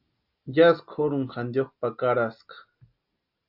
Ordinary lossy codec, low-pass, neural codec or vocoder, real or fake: Opus, 64 kbps; 5.4 kHz; none; real